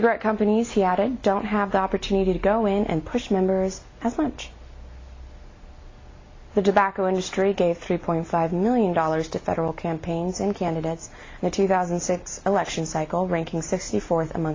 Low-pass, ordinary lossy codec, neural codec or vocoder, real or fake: 7.2 kHz; AAC, 32 kbps; none; real